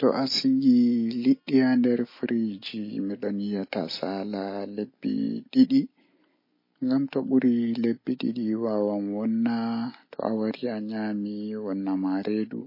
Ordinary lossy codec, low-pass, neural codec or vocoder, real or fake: MP3, 24 kbps; 5.4 kHz; none; real